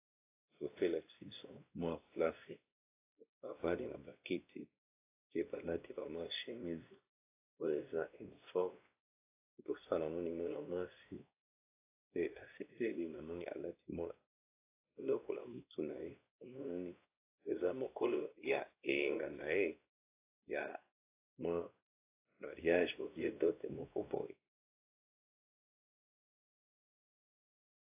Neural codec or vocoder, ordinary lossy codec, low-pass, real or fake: codec, 16 kHz, 1 kbps, X-Codec, WavLM features, trained on Multilingual LibriSpeech; AAC, 24 kbps; 3.6 kHz; fake